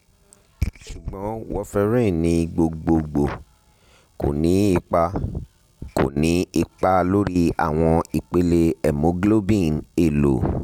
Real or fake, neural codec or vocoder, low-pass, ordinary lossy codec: real; none; 19.8 kHz; none